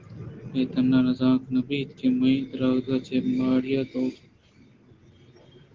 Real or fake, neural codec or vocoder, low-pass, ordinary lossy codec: real; none; 7.2 kHz; Opus, 16 kbps